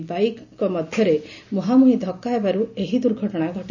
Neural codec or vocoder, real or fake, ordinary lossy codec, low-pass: none; real; none; 7.2 kHz